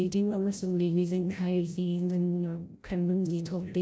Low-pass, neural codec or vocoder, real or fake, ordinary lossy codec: none; codec, 16 kHz, 0.5 kbps, FreqCodec, larger model; fake; none